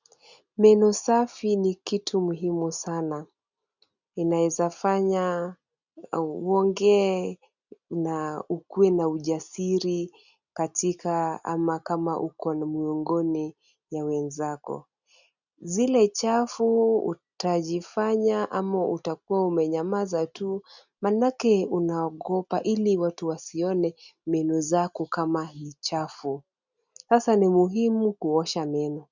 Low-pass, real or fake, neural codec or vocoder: 7.2 kHz; real; none